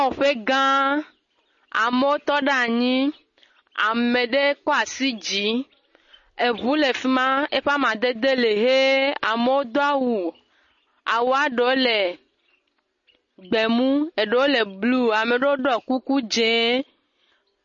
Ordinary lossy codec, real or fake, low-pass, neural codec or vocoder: MP3, 32 kbps; real; 7.2 kHz; none